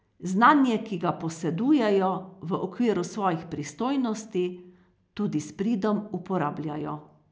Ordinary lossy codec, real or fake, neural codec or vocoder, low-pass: none; real; none; none